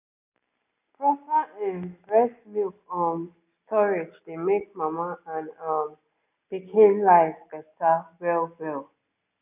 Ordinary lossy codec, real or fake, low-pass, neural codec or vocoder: none; real; 3.6 kHz; none